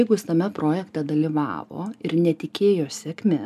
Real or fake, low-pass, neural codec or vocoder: real; 14.4 kHz; none